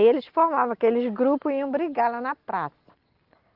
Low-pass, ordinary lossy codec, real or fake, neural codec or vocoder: 5.4 kHz; Opus, 16 kbps; real; none